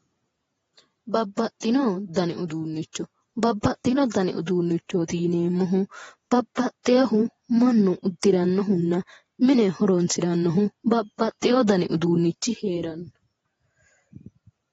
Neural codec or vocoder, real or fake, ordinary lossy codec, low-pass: vocoder, 48 kHz, 128 mel bands, Vocos; fake; AAC, 24 kbps; 19.8 kHz